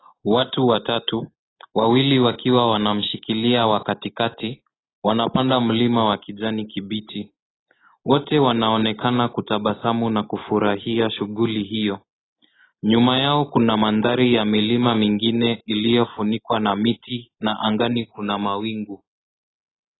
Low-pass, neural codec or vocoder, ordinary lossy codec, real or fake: 7.2 kHz; none; AAC, 16 kbps; real